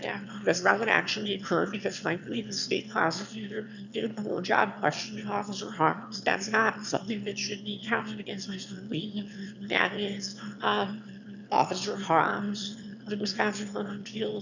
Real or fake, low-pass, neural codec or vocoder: fake; 7.2 kHz; autoencoder, 22.05 kHz, a latent of 192 numbers a frame, VITS, trained on one speaker